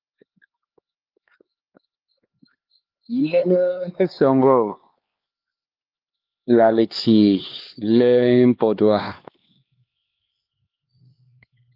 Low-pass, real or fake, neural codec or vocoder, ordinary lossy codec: 5.4 kHz; fake; codec, 16 kHz, 2 kbps, X-Codec, HuBERT features, trained on LibriSpeech; Opus, 24 kbps